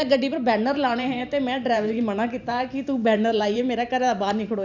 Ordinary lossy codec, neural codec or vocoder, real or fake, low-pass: none; vocoder, 44.1 kHz, 128 mel bands every 512 samples, BigVGAN v2; fake; 7.2 kHz